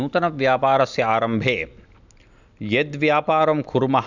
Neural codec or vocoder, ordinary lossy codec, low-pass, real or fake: none; none; 7.2 kHz; real